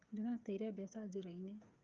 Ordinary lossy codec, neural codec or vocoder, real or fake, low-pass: Opus, 24 kbps; codec, 16 kHz, 8 kbps, FreqCodec, smaller model; fake; 7.2 kHz